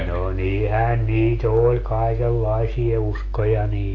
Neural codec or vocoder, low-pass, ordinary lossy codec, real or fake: none; 7.2 kHz; AAC, 32 kbps; real